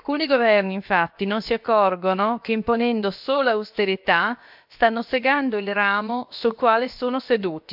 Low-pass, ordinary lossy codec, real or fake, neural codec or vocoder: 5.4 kHz; MP3, 48 kbps; fake; codec, 16 kHz, about 1 kbps, DyCAST, with the encoder's durations